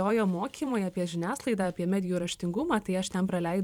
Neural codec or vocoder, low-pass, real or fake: vocoder, 44.1 kHz, 128 mel bands every 512 samples, BigVGAN v2; 19.8 kHz; fake